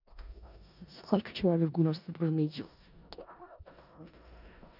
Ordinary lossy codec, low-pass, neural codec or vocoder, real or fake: none; 5.4 kHz; codec, 16 kHz in and 24 kHz out, 0.4 kbps, LongCat-Audio-Codec, four codebook decoder; fake